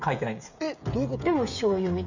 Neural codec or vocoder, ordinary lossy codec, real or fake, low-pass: codec, 16 kHz, 16 kbps, FreqCodec, smaller model; none; fake; 7.2 kHz